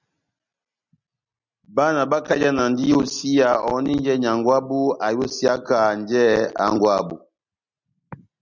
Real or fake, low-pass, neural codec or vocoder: real; 7.2 kHz; none